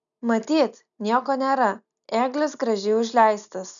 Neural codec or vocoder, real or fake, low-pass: none; real; 7.2 kHz